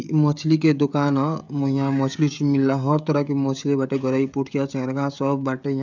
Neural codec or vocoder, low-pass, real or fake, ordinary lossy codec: codec, 16 kHz, 16 kbps, FreqCodec, smaller model; 7.2 kHz; fake; none